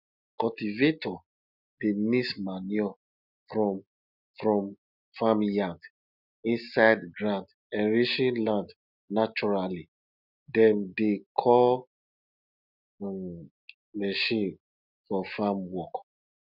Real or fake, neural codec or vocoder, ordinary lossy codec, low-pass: real; none; none; 5.4 kHz